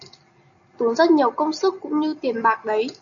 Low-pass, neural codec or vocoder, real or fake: 7.2 kHz; none; real